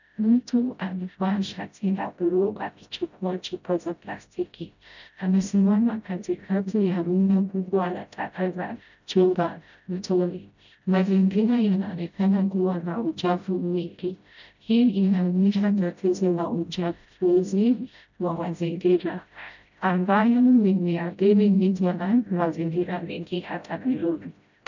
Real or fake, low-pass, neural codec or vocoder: fake; 7.2 kHz; codec, 16 kHz, 0.5 kbps, FreqCodec, smaller model